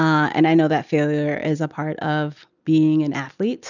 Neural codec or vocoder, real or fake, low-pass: none; real; 7.2 kHz